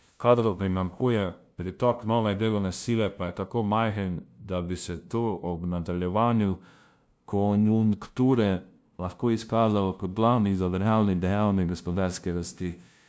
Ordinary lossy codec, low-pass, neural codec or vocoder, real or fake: none; none; codec, 16 kHz, 0.5 kbps, FunCodec, trained on LibriTTS, 25 frames a second; fake